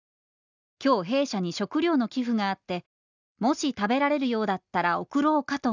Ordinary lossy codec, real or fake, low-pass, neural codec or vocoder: none; real; 7.2 kHz; none